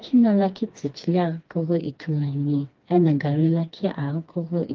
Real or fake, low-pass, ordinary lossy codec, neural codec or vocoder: fake; 7.2 kHz; Opus, 32 kbps; codec, 16 kHz, 2 kbps, FreqCodec, smaller model